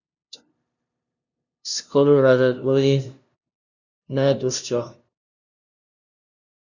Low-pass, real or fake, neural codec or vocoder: 7.2 kHz; fake; codec, 16 kHz, 0.5 kbps, FunCodec, trained on LibriTTS, 25 frames a second